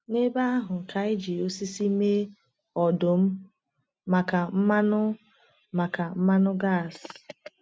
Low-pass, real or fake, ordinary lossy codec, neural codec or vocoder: none; real; none; none